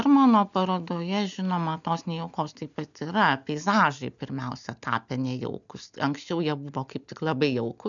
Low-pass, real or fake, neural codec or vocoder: 7.2 kHz; real; none